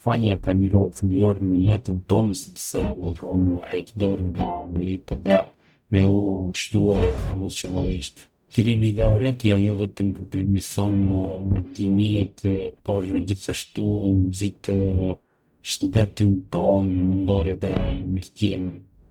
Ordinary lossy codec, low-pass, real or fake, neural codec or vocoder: none; 19.8 kHz; fake; codec, 44.1 kHz, 0.9 kbps, DAC